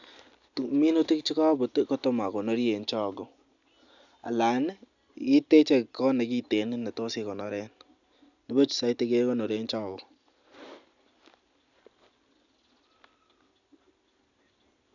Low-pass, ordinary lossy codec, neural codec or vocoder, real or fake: 7.2 kHz; none; none; real